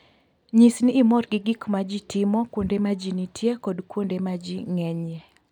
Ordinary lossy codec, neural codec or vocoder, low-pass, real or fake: none; none; 19.8 kHz; real